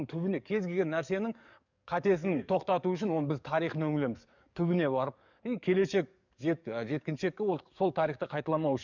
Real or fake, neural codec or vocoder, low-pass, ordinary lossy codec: fake; codec, 44.1 kHz, 7.8 kbps, DAC; 7.2 kHz; none